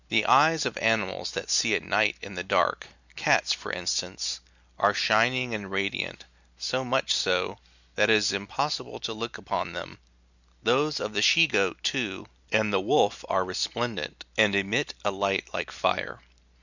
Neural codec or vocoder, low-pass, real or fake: none; 7.2 kHz; real